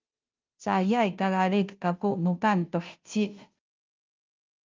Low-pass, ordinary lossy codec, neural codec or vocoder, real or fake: 7.2 kHz; Opus, 24 kbps; codec, 16 kHz, 0.5 kbps, FunCodec, trained on Chinese and English, 25 frames a second; fake